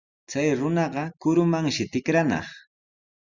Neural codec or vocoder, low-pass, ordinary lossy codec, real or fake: none; 7.2 kHz; Opus, 64 kbps; real